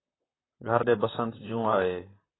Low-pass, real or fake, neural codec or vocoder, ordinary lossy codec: 7.2 kHz; fake; vocoder, 44.1 kHz, 128 mel bands, Pupu-Vocoder; AAC, 16 kbps